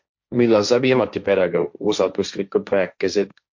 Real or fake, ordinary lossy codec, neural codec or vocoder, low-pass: fake; MP3, 48 kbps; codec, 16 kHz, 1.1 kbps, Voila-Tokenizer; 7.2 kHz